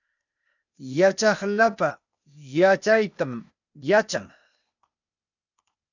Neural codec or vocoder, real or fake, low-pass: codec, 16 kHz, 0.8 kbps, ZipCodec; fake; 7.2 kHz